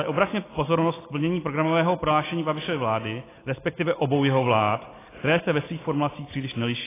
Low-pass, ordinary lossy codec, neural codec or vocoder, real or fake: 3.6 kHz; AAC, 16 kbps; none; real